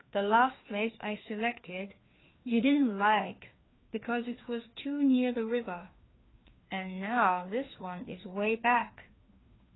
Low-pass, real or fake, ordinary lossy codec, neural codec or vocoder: 7.2 kHz; fake; AAC, 16 kbps; codec, 16 kHz, 2 kbps, FreqCodec, larger model